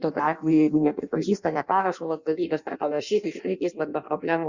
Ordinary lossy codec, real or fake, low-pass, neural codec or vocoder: Opus, 64 kbps; fake; 7.2 kHz; codec, 16 kHz in and 24 kHz out, 0.6 kbps, FireRedTTS-2 codec